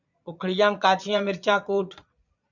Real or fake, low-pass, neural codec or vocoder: fake; 7.2 kHz; vocoder, 22.05 kHz, 80 mel bands, Vocos